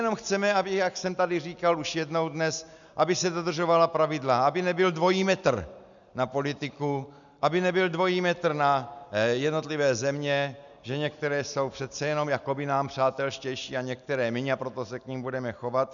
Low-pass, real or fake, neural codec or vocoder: 7.2 kHz; real; none